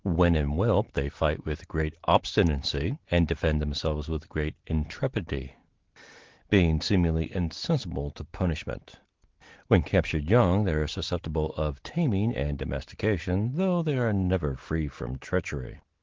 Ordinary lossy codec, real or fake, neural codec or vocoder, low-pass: Opus, 16 kbps; real; none; 7.2 kHz